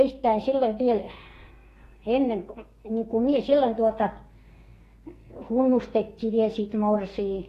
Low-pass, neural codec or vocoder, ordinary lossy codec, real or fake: 14.4 kHz; codec, 44.1 kHz, 2.6 kbps, SNAC; AAC, 48 kbps; fake